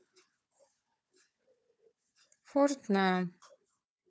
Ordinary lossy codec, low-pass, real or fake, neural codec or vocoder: none; none; fake; codec, 16 kHz, 4 kbps, FunCodec, trained on Chinese and English, 50 frames a second